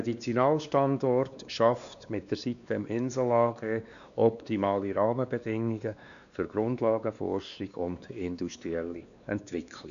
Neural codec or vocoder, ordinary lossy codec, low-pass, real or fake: codec, 16 kHz, 2 kbps, X-Codec, WavLM features, trained on Multilingual LibriSpeech; none; 7.2 kHz; fake